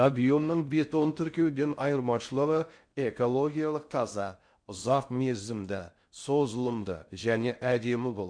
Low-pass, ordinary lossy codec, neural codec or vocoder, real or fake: 9.9 kHz; MP3, 64 kbps; codec, 16 kHz in and 24 kHz out, 0.6 kbps, FocalCodec, streaming, 4096 codes; fake